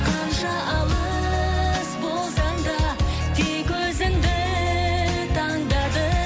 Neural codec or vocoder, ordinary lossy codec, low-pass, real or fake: none; none; none; real